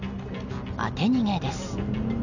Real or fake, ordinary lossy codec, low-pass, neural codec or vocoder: real; none; 7.2 kHz; none